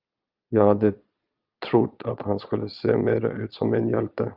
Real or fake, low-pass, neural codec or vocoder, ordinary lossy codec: fake; 5.4 kHz; vocoder, 22.05 kHz, 80 mel bands, Vocos; Opus, 24 kbps